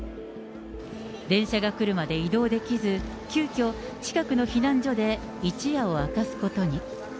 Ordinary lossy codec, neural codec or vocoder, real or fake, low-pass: none; none; real; none